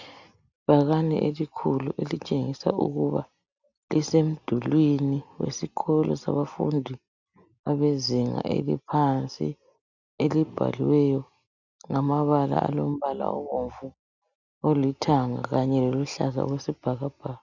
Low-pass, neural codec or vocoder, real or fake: 7.2 kHz; none; real